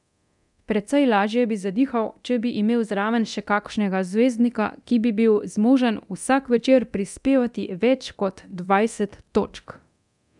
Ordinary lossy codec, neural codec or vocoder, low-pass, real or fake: none; codec, 24 kHz, 0.9 kbps, DualCodec; 10.8 kHz; fake